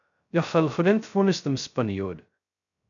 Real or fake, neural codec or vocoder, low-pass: fake; codec, 16 kHz, 0.2 kbps, FocalCodec; 7.2 kHz